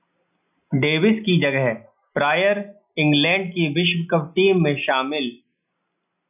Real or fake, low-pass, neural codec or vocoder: real; 3.6 kHz; none